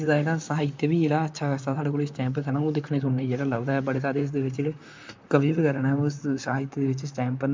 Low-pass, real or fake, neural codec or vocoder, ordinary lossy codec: 7.2 kHz; fake; vocoder, 44.1 kHz, 128 mel bands, Pupu-Vocoder; MP3, 64 kbps